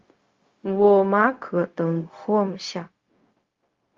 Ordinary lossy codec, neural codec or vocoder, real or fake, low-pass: Opus, 32 kbps; codec, 16 kHz, 0.4 kbps, LongCat-Audio-Codec; fake; 7.2 kHz